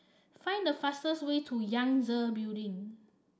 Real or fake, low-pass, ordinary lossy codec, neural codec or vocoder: real; none; none; none